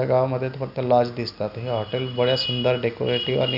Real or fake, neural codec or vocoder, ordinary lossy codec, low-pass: real; none; none; 5.4 kHz